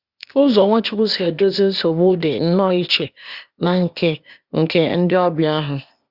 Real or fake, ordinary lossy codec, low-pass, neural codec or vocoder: fake; Opus, 64 kbps; 5.4 kHz; codec, 16 kHz, 0.8 kbps, ZipCodec